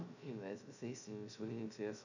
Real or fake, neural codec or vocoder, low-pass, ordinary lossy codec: fake; codec, 16 kHz, 0.2 kbps, FocalCodec; 7.2 kHz; none